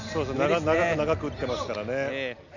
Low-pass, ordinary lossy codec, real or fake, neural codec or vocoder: 7.2 kHz; none; real; none